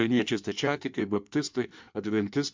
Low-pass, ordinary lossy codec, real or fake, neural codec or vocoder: 7.2 kHz; MP3, 64 kbps; fake; codec, 16 kHz in and 24 kHz out, 1.1 kbps, FireRedTTS-2 codec